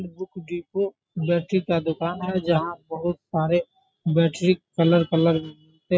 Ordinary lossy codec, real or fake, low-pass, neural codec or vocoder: none; real; none; none